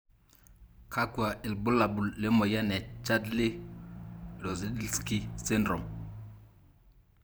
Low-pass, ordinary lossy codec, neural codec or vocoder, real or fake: none; none; vocoder, 44.1 kHz, 128 mel bands every 512 samples, BigVGAN v2; fake